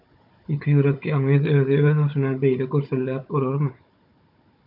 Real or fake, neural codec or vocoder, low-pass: fake; codec, 16 kHz, 16 kbps, FunCodec, trained on Chinese and English, 50 frames a second; 5.4 kHz